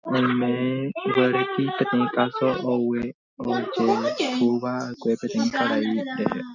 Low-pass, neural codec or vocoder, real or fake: 7.2 kHz; none; real